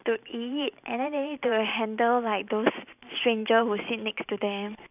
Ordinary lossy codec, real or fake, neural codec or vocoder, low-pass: none; fake; vocoder, 44.1 kHz, 128 mel bands every 512 samples, BigVGAN v2; 3.6 kHz